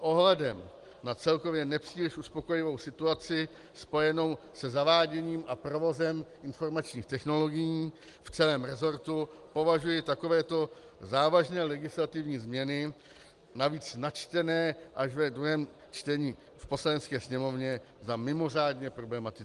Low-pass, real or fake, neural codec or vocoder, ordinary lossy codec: 10.8 kHz; real; none; Opus, 24 kbps